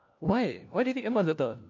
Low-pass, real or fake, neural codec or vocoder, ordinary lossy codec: 7.2 kHz; fake; codec, 16 kHz, 1 kbps, FunCodec, trained on LibriTTS, 50 frames a second; AAC, 48 kbps